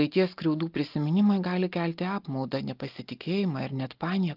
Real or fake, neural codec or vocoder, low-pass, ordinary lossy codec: real; none; 5.4 kHz; Opus, 32 kbps